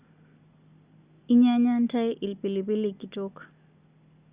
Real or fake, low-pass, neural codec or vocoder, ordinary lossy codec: real; 3.6 kHz; none; Opus, 64 kbps